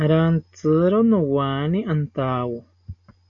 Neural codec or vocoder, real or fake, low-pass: none; real; 7.2 kHz